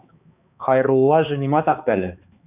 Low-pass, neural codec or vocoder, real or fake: 3.6 kHz; codec, 16 kHz, 2 kbps, X-Codec, HuBERT features, trained on balanced general audio; fake